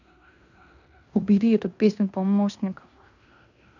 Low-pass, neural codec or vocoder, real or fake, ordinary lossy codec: 7.2 kHz; codec, 16 kHz in and 24 kHz out, 0.9 kbps, LongCat-Audio-Codec, fine tuned four codebook decoder; fake; none